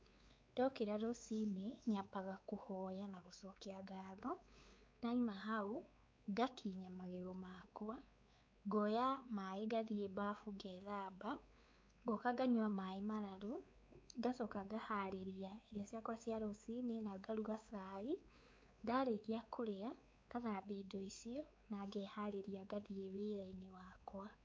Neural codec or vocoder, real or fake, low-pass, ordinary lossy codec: codec, 16 kHz, 4 kbps, X-Codec, WavLM features, trained on Multilingual LibriSpeech; fake; none; none